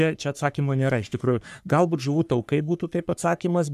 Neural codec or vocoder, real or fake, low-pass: codec, 44.1 kHz, 3.4 kbps, Pupu-Codec; fake; 14.4 kHz